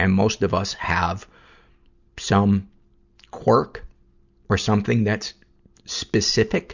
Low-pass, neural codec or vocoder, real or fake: 7.2 kHz; none; real